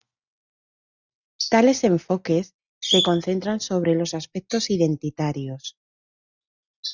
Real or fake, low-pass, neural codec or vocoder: real; 7.2 kHz; none